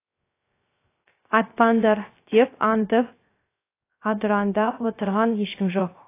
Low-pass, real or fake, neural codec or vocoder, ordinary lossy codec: 3.6 kHz; fake; codec, 16 kHz, 0.3 kbps, FocalCodec; AAC, 24 kbps